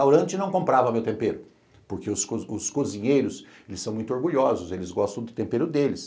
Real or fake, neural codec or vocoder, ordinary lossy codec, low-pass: real; none; none; none